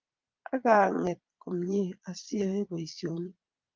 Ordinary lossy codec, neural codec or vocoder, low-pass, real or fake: Opus, 32 kbps; vocoder, 22.05 kHz, 80 mel bands, Vocos; 7.2 kHz; fake